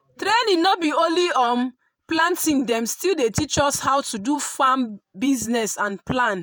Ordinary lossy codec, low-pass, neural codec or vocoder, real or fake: none; none; vocoder, 48 kHz, 128 mel bands, Vocos; fake